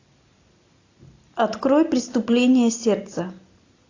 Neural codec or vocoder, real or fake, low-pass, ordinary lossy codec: none; real; 7.2 kHz; AAC, 48 kbps